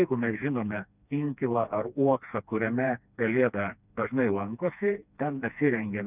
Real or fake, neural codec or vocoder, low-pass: fake; codec, 16 kHz, 2 kbps, FreqCodec, smaller model; 3.6 kHz